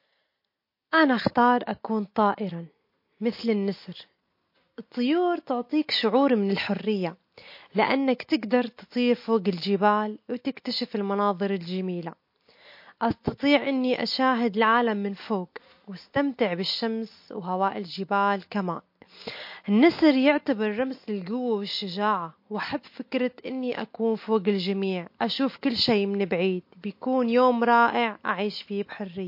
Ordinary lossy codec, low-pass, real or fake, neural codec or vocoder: MP3, 32 kbps; 5.4 kHz; real; none